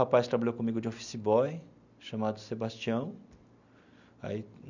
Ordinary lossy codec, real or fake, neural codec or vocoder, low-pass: none; real; none; 7.2 kHz